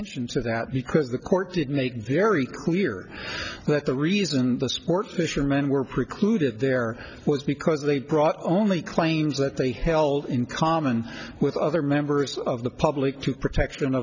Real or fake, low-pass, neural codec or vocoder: real; 7.2 kHz; none